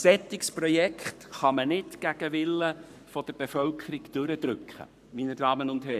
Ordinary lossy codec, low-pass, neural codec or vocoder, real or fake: none; 14.4 kHz; codec, 44.1 kHz, 7.8 kbps, Pupu-Codec; fake